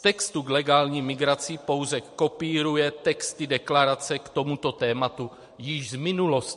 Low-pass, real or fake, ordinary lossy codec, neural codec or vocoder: 14.4 kHz; fake; MP3, 48 kbps; vocoder, 44.1 kHz, 128 mel bands every 512 samples, BigVGAN v2